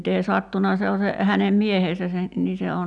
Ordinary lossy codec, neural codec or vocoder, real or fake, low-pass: none; none; real; 10.8 kHz